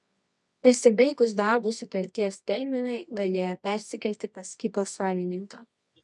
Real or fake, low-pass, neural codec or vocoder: fake; 10.8 kHz; codec, 24 kHz, 0.9 kbps, WavTokenizer, medium music audio release